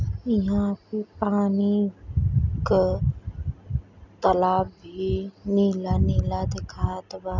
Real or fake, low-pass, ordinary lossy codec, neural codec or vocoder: real; 7.2 kHz; none; none